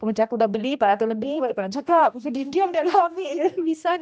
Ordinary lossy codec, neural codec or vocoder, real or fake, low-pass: none; codec, 16 kHz, 1 kbps, X-Codec, HuBERT features, trained on general audio; fake; none